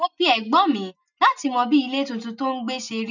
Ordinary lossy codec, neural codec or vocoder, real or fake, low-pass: none; none; real; 7.2 kHz